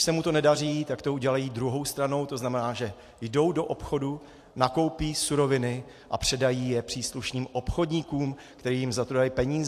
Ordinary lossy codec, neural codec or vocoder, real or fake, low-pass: AAC, 64 kbps; none; real; 14.4 kHz